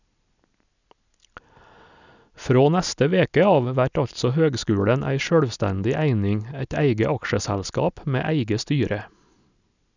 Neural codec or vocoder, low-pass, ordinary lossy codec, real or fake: none; 7.2 kHz; none; real